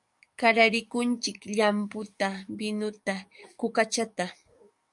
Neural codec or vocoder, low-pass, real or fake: codec, 44.1 kHz, 7.8 kbps, DAC; 10.8 kHz; fake